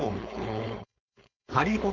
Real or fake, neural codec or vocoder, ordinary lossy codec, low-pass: fake; codec, 16 kHz, 4.8 kbps, FACodec; none; 7.2 kHz